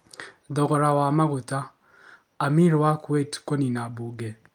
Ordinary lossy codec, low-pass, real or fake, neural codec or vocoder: Opus, 32 kbps; 19.8 kHz; real; none